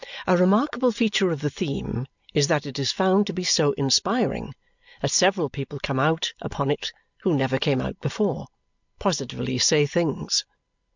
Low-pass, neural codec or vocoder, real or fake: 7.2 kHz; none; real